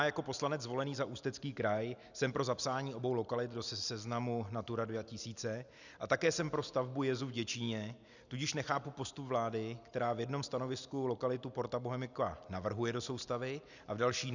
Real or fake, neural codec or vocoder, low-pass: real; none; 7.2 kHz